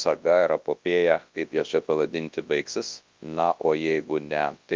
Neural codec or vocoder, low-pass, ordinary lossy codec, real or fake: codec, 24 kHz, 0.9 kbps, WavTokenizer, large speech release; 7.2 kHz; Opus, 32 kbps; fake